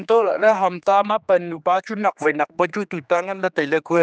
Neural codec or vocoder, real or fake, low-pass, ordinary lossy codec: codec, 16 kHz, 2 kbps, X-Codec, HuBERT features, trained on general audio; fake; none; none